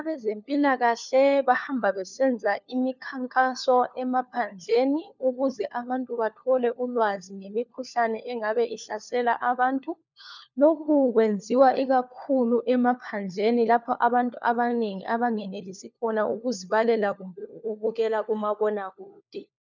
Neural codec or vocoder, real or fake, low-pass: codec, 16 kHz, 4 kbps, FunCodec, trained on LibriTTS, 50 frames a second; fake; 7.2 kHz